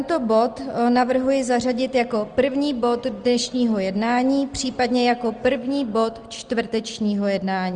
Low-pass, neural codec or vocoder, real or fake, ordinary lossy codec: 9.9 kHz; none; real; Opus, 24 kbps